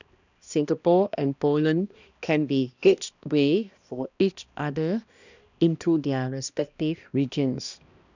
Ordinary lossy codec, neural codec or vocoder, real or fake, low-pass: none; codec, 16 kHz, 1 kbps, X-Codec, HuBERT features, trained on balanced general audio; fake; 7.2 kHz